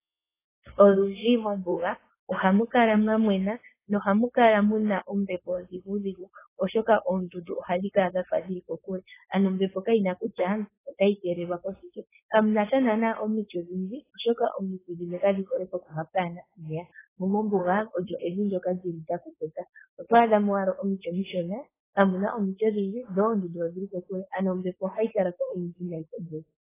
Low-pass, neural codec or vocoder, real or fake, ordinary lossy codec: 3.6 kHz; codec, 16 kHz, 4.8 kbps, FACodec; fake; AAC, 16 kbps